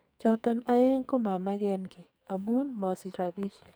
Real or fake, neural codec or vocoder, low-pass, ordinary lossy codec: fake; codec, 44.1 kHz, 2.6 kbps, SNAC; none; none